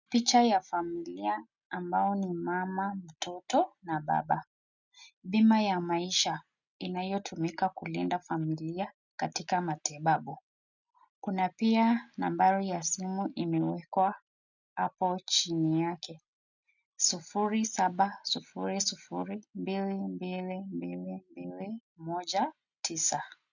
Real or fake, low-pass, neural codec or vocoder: real; 7.2 kHz; none